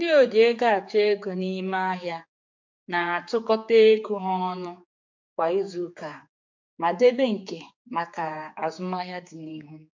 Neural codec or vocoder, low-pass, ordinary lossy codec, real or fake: codec, 16 kHz, 4 kbps, X-Codec, HuBERT features, trained on general audio; 7.2 kHz; MP3, 48 kbps; fake